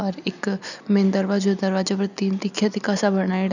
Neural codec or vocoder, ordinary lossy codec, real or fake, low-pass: none; none; real; 7.2 kHz